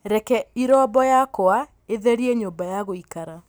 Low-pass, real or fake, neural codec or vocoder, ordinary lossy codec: none; real; none; none